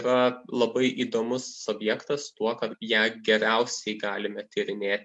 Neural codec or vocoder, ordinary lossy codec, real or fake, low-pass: none; MP3, 64 kbps; real; 10.8 kHz